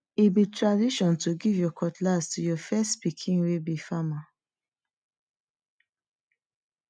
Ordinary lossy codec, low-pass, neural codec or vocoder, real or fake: none; 9.9 kHz; none; real